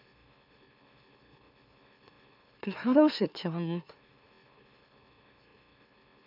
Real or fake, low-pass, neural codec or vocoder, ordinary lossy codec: fake; 5.4 kHz; autoencoder, 44.1 kHz, a latent of 192 numbers a frame, MeloTTS; none